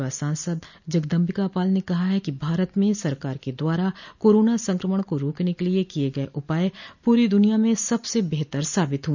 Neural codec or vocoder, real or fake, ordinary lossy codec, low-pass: none; real; none; 7.2 kHz